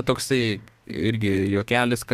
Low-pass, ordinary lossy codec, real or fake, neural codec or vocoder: 14.4 kHz; Opus, 64 kbps; fake; codec, 32 kHz, 1.9 kbps, SNAC